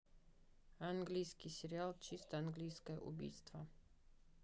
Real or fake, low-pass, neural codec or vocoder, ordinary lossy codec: real; none; none; none